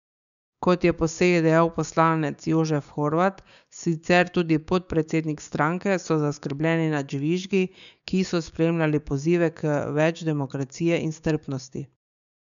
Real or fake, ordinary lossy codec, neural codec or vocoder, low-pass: fake; none; codec, 16 kHz, 6 kbps, DAC; 7.2 kHz